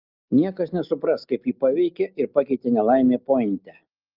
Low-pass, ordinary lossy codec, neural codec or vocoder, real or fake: 5.4 kHz; Opus, 24 kbps; none; real